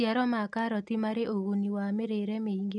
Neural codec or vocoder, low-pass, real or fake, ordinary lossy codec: none; 10.8 kHz; real; none